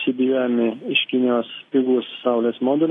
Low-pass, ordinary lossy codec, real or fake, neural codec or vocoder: 10.8 kHz; AAC, 32 kbps; real; none